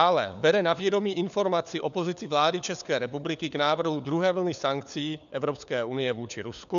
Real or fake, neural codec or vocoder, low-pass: fake; codec, 16 kHz, 4 kbps, FunCodec, trained on LibriTTS, 50 frames a second; 7.2 kHz